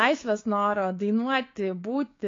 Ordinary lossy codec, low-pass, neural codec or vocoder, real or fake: AAC, 32 kbps; 7.2 kHz; codec, 16 kHz, 6 kbps, DAC; fake